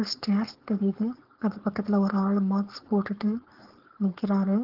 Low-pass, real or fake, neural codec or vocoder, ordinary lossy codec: 5.4 kHz; fake; codec, 16 kHz, 4.8 kbps, FACodec; Opus, 16 kbps